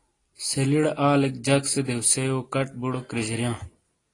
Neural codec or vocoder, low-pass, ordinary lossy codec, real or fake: none; 10.8 kHz; AAC, 32 kbps; real